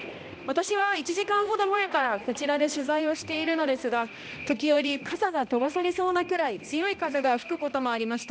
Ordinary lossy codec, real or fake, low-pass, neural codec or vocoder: none; fake; none; codec, 16 kHz, 1 kbps, X-Codec, HuBERT features, trained on balanced general audio